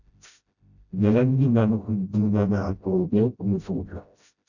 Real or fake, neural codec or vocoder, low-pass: fake; codec, 16 kHz, 0.5 kbps, FreqCodec, smaller model; 7.2 kHz